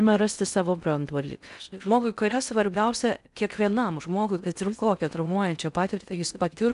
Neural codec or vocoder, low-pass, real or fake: codec, 16 kHz in and 24 kHz out, 0.6 kbps, FocalCodec, streaming, 4096 codes; 10.8 kHz; fake